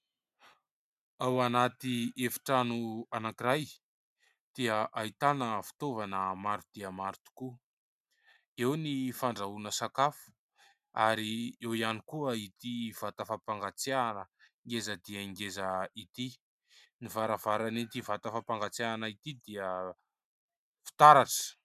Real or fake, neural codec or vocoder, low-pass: real; none; 14.4 kHz